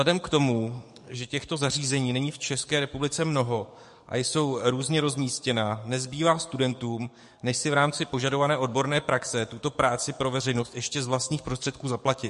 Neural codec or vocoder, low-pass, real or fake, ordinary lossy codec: codec, 44.1 kHz, 7.8 kbps, DAC; 14.4 kHz; fake; MP3, 48 kbps